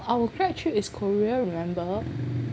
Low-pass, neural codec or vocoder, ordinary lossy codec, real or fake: none; none; none; real